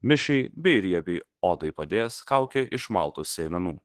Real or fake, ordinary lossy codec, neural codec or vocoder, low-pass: fake; Opus, 16 kbps; autoencoder, 48 kHz, 32 numbers a frame, DAC-VAE, trained on Japanese speech; 14.4 kHz